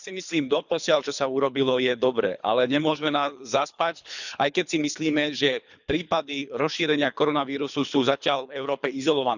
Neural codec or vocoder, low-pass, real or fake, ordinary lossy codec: codec, 24 kHz, 3 kbps, HILCodec; 7.2 kHz; fake; none